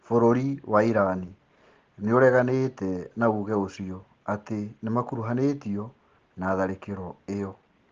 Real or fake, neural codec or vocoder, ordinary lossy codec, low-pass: real; none; Opus, 16 kbps; 7.2 kHz